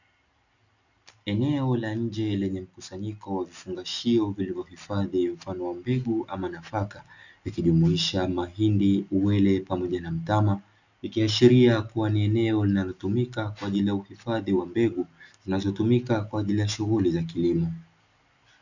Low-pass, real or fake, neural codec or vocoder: 7.2 kHz; real; none